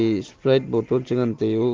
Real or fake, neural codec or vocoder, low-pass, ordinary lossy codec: real; none; 7.2 kHz; Opus, 16 kbps